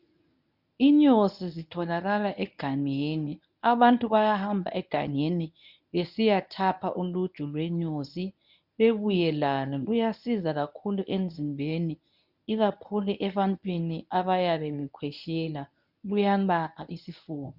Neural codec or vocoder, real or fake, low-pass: codec, 24 kHz, 0.9 kbps, WavTokenizer, medium speech release version 1; fake; 5.4 kHz